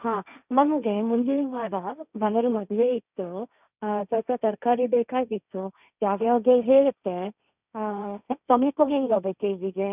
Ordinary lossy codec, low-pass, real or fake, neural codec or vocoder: none; 3.6 kHz; fake; codec, 16 kHz, 1.1 kbps, Voila-Tokenizer